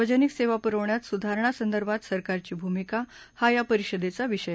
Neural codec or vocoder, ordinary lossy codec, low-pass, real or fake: none; none; none; real